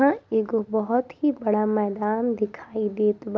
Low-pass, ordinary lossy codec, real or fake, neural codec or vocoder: none; none; real; none